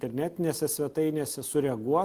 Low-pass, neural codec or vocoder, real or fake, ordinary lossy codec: 14.4 kHz; none; real; Opus, 24 kbps